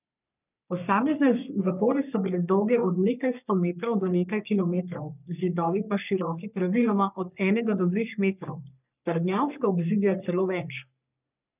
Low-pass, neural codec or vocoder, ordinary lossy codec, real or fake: 3.6 kHz; codec, 44.1 kHz, 3.4 kbps, Pupu-Codec; none; fake